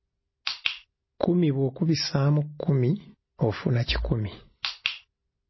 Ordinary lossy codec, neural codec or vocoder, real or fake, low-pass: MP3, 24 kbps; none; real; 7.2 kHz